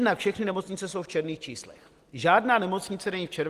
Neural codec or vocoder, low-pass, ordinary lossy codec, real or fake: none; 14.4 kHz; Opus, 24 kbps; real